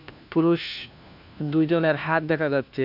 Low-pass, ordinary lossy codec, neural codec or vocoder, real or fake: 5.4 kHz; none; codec, 16 kHz, 1 kbps, FunCodec, trained on LibriTTS, 50 frames a second; fake